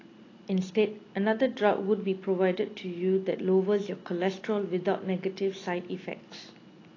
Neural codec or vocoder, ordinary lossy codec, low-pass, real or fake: none; AAC, 32 kbps; 7.2 kHz; real